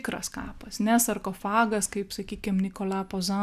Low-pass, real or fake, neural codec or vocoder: 14.4 kHz; real; none